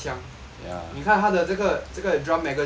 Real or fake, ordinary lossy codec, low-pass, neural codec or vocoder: real; none; none; none